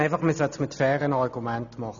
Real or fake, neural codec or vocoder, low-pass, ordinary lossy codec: real; none; 7.2 kHz; none